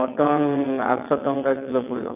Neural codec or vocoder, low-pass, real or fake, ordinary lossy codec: vocoder, 22.05 kHz, 80 mel bands, WaveNeXt; 3.6 kHz; fake; AAC, 32 kbps